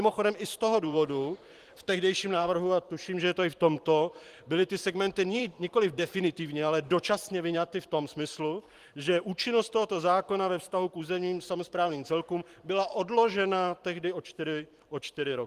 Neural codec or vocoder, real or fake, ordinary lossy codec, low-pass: vocoder, 44.1 kHz, 128 mel bands every 512 samples, BigVGAN v2; fake; Opus, 24 kbps; 14.4 kHz